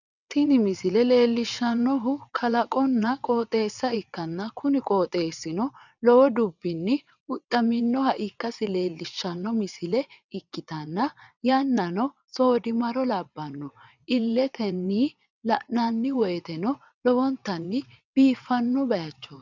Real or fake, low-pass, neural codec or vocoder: fake; 7.2 kHz; vocoder, 22.05 kHz, 80 mel bands, WaveNeXt